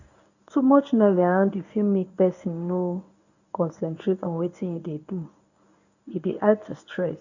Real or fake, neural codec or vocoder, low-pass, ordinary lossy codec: fake; codec, 24 kHz, 0.9 kbps, WavTokenizer, medium speech release version 1; 7.2 kHz; none